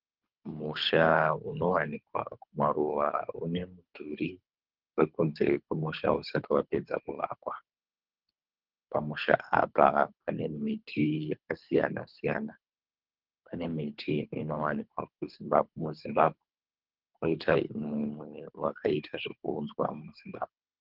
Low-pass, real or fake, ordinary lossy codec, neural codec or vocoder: 5.4 kHz; fake; Opus, 32 kbps; codec, 24 kHz, 3 kbps, HILCodec